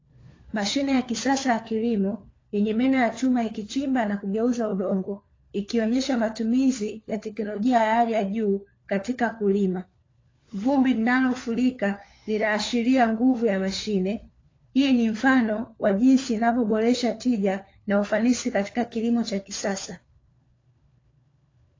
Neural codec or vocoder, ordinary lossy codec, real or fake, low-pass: codec, 16 kHz, 4 kbps, FunCodec, trained on LibriTTS, 50 frames a second; AAC, 32 kbps; fake; 7.2 kHz